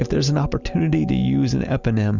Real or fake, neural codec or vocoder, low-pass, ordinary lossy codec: real; none; 7.2 kHz; Opus, 64 kbps